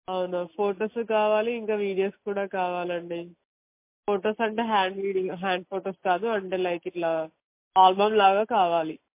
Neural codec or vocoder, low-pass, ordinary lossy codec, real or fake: none; 3.6 kHz; MP3, 24 kbps; real